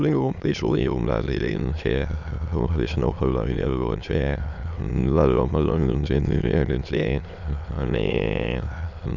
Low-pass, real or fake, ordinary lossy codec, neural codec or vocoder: 7.2 kHz; fake; none; autoencoder, 22.05 kHz, a latent of 192 numbers a frame, VITS, trained on many speakers